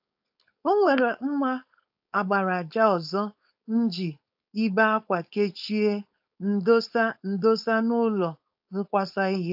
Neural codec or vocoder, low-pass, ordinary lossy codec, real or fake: codec, 16 kHz, 4.8 kbps, FACodec; 5.4 kHz; AAC, 48 kbps; fake